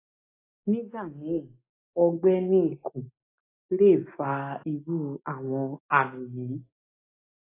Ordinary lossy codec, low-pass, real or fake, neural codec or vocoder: AAC, 16 kbps; 3.6 kHz; real; none